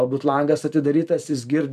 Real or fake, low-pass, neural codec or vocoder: fake; 14.4 kHz; vocoder, 44.1 kHz, 128 mel bands every 256 samples, BigVGAN v2